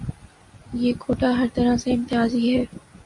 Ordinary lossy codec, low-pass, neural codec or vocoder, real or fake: AAC, 64 kbps; 10.8 kHz; none; real